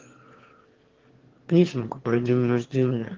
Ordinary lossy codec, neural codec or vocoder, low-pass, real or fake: Opus, 16 kbps; autoencoder, 22.05 kHz, a latent of 192 numbers a frame, VITS, trained on one speaker; 7.2 kHz; fake